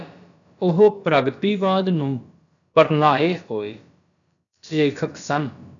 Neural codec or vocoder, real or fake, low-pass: codec, 16 kHz, about 1 kbps, DyCAST, with the encoder's durations; fake; 7.2 kHz